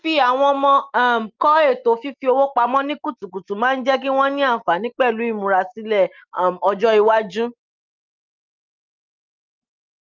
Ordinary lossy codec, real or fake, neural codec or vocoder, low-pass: Opus, 24 kbps; real; none; 7.2 kHz